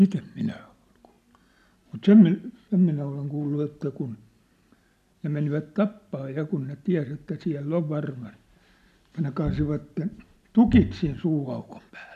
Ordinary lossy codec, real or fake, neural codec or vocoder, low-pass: none; real; none; 14.4 kHz